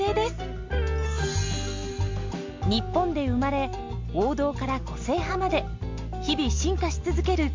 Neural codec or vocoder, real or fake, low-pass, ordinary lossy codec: none; real; 7.2 kHz; MP3, 48 kbps